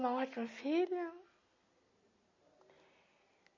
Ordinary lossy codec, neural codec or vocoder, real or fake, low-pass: MP3, 32 kbps; none; real; 7.2 kHz